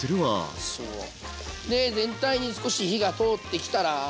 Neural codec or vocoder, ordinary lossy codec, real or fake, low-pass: none; none; real; none